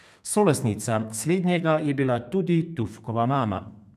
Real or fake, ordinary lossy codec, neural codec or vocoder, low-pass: fake; none; codec, 32 kHz, 1.9 kbps, SNAC; 14.4 kHz